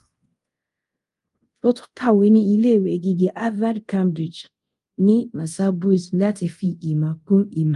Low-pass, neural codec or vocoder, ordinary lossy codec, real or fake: 10.8 kHz; codec, 24 kHz, 0.5 kbps, DualCodec; Opus, 32 kbps; fake